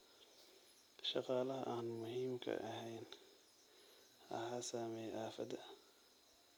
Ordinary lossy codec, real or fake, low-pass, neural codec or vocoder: none; real; none; none